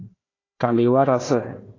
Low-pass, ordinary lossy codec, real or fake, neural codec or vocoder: 7.2 kHz; AAC, 32 kbps; fake; codec, 16 kHz, 1 kbps, FunCodec, trained on Chinese and English, 50 frames a second